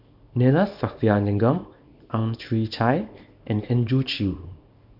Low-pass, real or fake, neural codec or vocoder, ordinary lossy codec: 5.4 kHz; fake; codec, 24 kHz, 0.9 kbps, WavTokenizer, small release; none